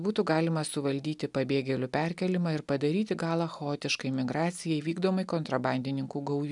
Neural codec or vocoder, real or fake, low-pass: none; real; 10.8 kHz